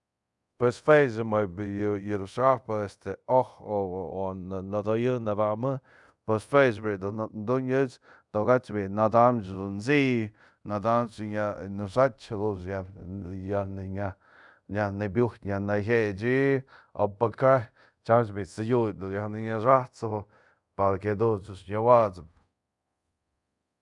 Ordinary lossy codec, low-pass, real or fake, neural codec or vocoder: none; 10.8 kHz; fake; codec, 24 kHz, 0.5 kbps, DualCodec